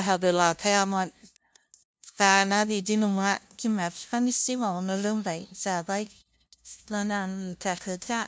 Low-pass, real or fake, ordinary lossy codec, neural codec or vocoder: none; fake; none; codec, 16 kHz, 0.5 kbps, FunCodec, trained on LibriTTS, 25 frames a second